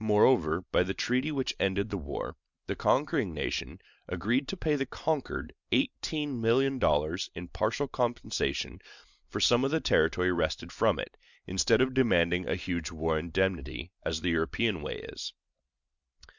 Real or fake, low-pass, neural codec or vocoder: real; 7.2 kHz; none